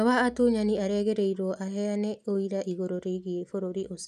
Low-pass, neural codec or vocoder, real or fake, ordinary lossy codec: 14.4 kHz; none; real; none